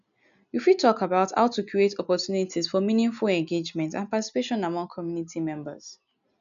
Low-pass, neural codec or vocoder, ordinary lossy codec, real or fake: 7.2 kHz; none; none; real